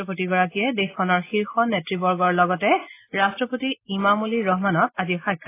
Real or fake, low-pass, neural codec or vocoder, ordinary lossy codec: real; 3.6 kHz; none; AAC, 24 kbps